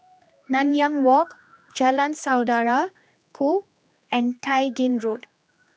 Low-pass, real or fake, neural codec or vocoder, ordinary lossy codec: none; fake; codec, 16 kHz, 2 kbps, X-Codec, HuBERT features, trained on general audio; none